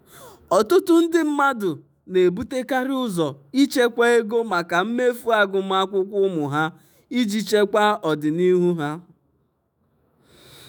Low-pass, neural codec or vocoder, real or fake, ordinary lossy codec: none; autoencoder, 48 kHz, 128 numbers a frame, DAC-VAE, trained on Japanese speech; fake; none